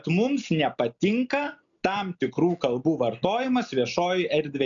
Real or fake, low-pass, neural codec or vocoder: real; 7.2 kHz; none